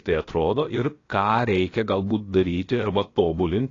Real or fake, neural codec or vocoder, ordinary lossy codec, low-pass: fake; codec, 16 kHz, about 1 kbps, DyCAST, with the encoder's durations; AAC, 32 kbps; 7.2 kHz